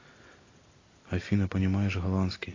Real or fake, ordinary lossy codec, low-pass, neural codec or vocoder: real; AAC, 32 kbps; 7.2 kHz; none